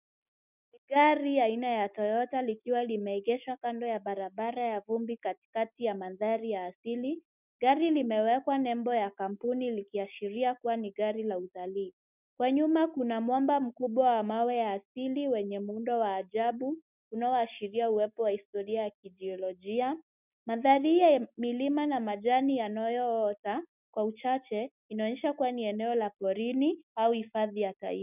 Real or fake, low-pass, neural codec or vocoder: real; 3.6 kHz; none